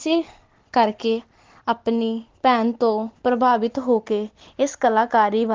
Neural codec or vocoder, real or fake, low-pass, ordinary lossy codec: none; real; 7.2 kHz; Opus, 16 kbps